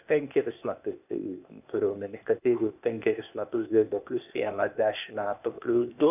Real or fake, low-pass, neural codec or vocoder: fake; 3.6 kHz; codec, 16 kHz, 0.8 kbps, ZipCodec